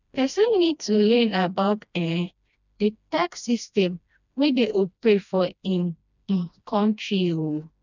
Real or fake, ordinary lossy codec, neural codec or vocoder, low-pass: fake; none; codec, 16 kHz, 1 kbps, FreqCodec, smaller model; 7.2 kHz